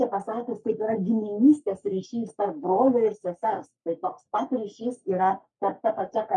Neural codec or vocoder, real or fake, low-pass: codec, 44.1 kHz, 3.4 kbps, Pupu-Codec; fake; 10.8 kHz